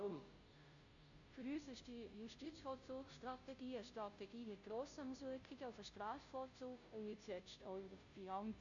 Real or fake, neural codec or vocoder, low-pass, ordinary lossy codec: fake; codec, 16 kHz, 0.5 kbps, FunCodec, trained on Chinese and English, 25 frames a second; 7.2 kHz; none